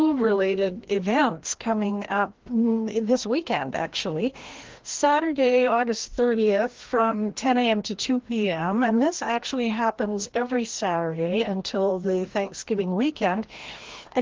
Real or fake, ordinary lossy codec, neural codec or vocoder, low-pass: fake; Opus, 16 kbps; codec, 16 kHz, 1 kbps, FreqCodec, larger model; 7.2 kHz